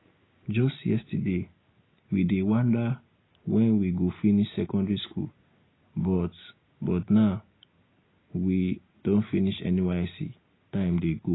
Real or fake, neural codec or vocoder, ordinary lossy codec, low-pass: real; none; AAC, 16 kbps; 7.2 kHz